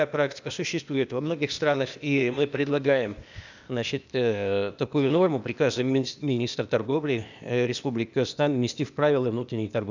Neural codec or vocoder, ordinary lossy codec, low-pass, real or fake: codec, 16 kHz, 0.8 kbps, ZipCodec; none; 7.2 kHz; fake